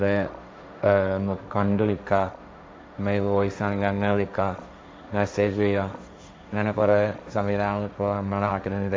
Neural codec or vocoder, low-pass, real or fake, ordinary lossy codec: codec, 16 kHz, 1.1 kbps, Voila-Tokenizer; none; fake; none